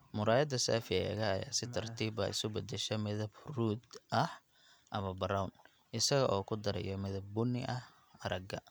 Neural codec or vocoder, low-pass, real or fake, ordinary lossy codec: none; none; real; none